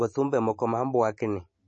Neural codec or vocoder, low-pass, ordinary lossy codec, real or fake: none; 9.9 kHz; MP3, 32 kbps; real